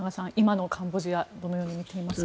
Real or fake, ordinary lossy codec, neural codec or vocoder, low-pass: real; none; none; none